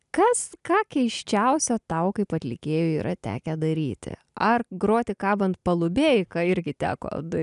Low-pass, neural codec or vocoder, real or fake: 10.8 kHz; none; real